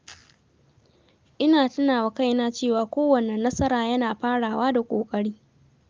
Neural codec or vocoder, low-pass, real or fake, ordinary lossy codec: none; 7.2 kHz; real; Opus, 32 kbps